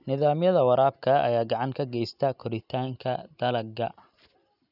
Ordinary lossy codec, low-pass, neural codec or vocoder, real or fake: none; 5.4 kHz; none; real